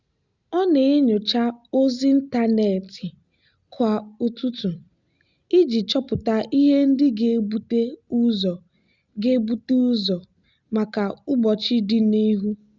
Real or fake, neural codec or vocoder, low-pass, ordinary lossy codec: real; none; 7.2 kHz; Opus, 64 kbps